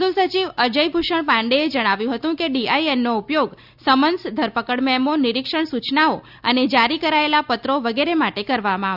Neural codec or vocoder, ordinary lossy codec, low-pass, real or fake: none; Opus, 64 kbps; 5.4 kHz; real